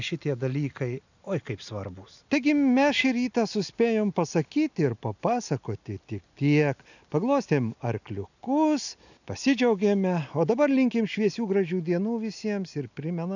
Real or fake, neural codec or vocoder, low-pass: real; none; 7.2 kHz